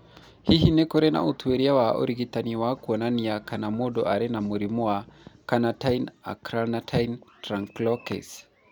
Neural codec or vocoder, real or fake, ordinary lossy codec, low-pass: none; real; none; 19.8 kHz